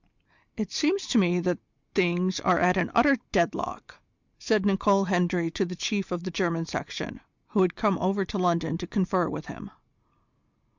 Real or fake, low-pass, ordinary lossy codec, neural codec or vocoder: real; 7.2 kHz; Opus, 64 kbps; none